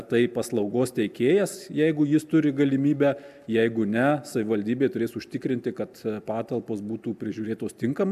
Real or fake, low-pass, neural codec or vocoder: real; 14.4 kHz; none